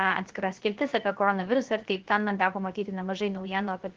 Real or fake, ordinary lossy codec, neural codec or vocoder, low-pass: fake; Opus, 16 kbps; codec, 16 kHz, 0.7 kbps, FocalCodec; 7.2 kHz